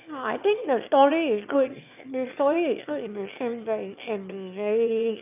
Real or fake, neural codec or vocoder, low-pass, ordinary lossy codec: fake; autoencoder, 22.05 kHz, a latent of 192 numbers a frame, VITS, trained on one speaker; 3.6 kHz; none